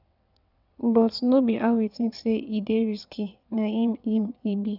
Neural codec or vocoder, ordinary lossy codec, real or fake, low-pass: vocoder, 22.05 kHz, 80 mel bands, WaveNeXt; none; fake; 5.4 kHz